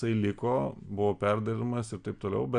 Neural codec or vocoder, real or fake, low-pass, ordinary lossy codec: none; real; 9.9 kHz; Opus, 64 kbps